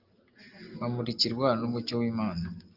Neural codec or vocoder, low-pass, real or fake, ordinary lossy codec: none; 5.4 kHz; real; Opus, 32 kbps